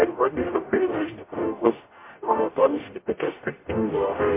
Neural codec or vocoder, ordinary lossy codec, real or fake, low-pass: codec, 44.1 kHz, 0.9 kbps, DAC; MP3, 24 kbps; fake; 3.6 kHz